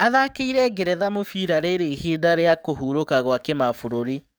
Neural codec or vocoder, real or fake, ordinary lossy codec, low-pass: codec, 44.1 kHz, 7.8 kbps, DAC; fake; none; none